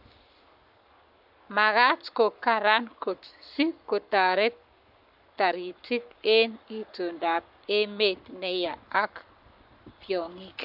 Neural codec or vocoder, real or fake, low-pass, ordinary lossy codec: codec, 44.1 kHz, 7.8 kbps, Pupu-Codec; fake; 5.4 kHz; AAC, 48 kbps